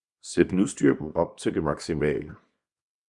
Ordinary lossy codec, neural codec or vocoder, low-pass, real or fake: Opus, 64 kbps; codec, 24 kHz, 0.9 kbps, WavTokenizer, small release; 10.8 kHz; fake